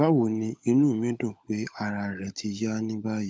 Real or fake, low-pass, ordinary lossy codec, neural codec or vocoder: fake; none; none; codec, 16 kHz, 16 kbps, FunCodec, trained on LibriTTS, 50 frames a second